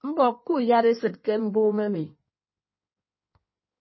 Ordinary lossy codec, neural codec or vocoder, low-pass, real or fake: MP3, 24 kbps; codec, 16 kHz in and 24 kHz out, 1.1 kbps, FireRedTTS-2 codec; 7.2 kHz; fake